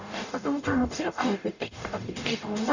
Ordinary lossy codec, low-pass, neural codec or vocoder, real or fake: none; 7.2 kHz; codec, 44.1 kHz, 0.9 kbps, DAC; fake